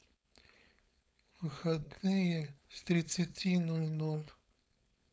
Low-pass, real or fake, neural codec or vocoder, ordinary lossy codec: none; fake; codec, 16 kHz, 4.8 kbps, FACodec; none